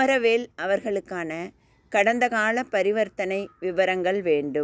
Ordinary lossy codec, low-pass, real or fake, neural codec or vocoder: none; none; real; none